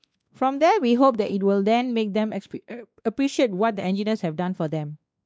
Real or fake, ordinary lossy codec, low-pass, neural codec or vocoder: fake; none; none; codec, 16 kHz, 2 kbps, X-Codec, WavLM features, trained on Multilingual LibriSpeech